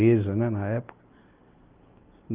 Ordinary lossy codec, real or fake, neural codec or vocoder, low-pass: Opus, 24 kbps; real; none; 3.6 kHz